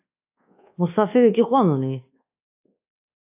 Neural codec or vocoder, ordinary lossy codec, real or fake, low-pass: codec, 24 kHz, 1.2 kbps, DualCodec; AAC, 24 kbps; fake; 3.6 kHz